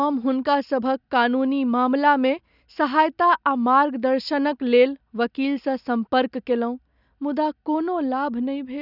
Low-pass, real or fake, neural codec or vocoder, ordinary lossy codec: 5.4 kHz; real; none; none